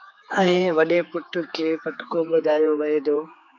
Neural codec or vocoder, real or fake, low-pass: codec, 16 kHz, 4 kbps, X-Codec, HuBERT features, trained on general audio; fake; 7.2 kHz